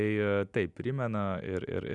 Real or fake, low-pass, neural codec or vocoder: real; 10.8 kHz; none